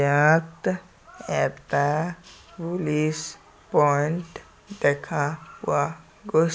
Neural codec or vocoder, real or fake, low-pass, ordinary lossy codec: none; real; none; none